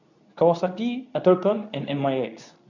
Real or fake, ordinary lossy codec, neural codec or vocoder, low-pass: fake; none; codec, 24 kHz, 0.9 kbps, WavTokenizer, medium speech release version 2; 7.2 kHz